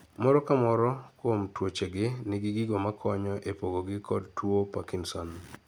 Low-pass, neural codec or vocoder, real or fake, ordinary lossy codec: none; none; real; none